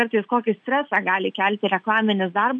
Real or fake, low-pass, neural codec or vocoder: real; 9.9 kHz; none